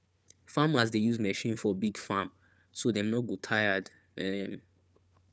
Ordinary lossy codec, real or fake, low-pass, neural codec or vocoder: none; fake; none; codec, 16 kHz, 4 kbps, FunCodec, trained on Chinese and English, 50 frames a second